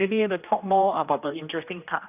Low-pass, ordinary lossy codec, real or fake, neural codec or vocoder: 3.6 kHz; none; fake; codec, 16 kHz, 1 kbps, X-Codec, HuBERT features, trained on general audio